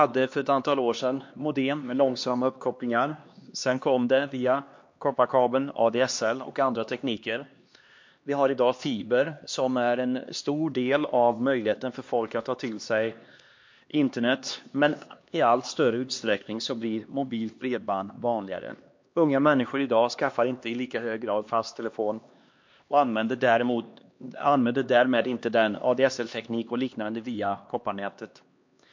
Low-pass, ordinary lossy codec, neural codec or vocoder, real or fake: 7.2 kHz; MP3, 48 kbps; codec, 16 kHz, 2 kbps, X-Codec, HuBERT features, trained on LibriSpeech; fake